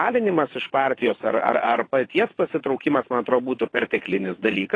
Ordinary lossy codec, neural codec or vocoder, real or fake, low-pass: AAC, 32 kbps; vocoder, 22.05 kHz, 80 mel bands, WaveNeXt; fake; 9.9 kHz